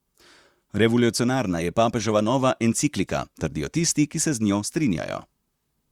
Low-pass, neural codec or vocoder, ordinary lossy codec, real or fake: 19.8 kHz; vocoder, 44.1 kHz, 128 mel bands, Pupu-Vocoder; Opus, 64 kbps; fake